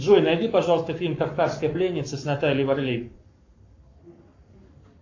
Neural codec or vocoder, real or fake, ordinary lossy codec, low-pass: codec, 44.1 kHz, 7.8 kbps, Pupu-Codec; fake; AAC, 48 kbps; 7.2 kHz